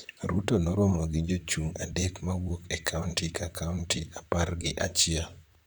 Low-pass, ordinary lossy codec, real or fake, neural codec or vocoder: none; none; fake; vocoder, 44.1 kHz, 128 mel bands, Pupu-Vocoder